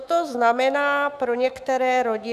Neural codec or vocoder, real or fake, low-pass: autoencoder, 48 kHz, 128 numbers a frame, DAC-VAE, trained on Japanese speech; fake; 14.4 kHz